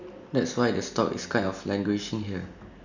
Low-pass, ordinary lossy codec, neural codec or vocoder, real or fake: 7.2 kHz; none; none; real